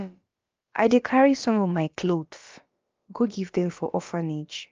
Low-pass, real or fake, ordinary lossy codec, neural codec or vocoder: 7.2 kHz; fake; Opus, 24 kbps; codec, 16 kHz, about 1 kbps, DyCAST, with the encoder's durations